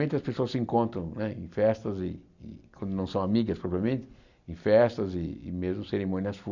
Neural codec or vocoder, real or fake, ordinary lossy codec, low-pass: none; real; none; 7.2 kHz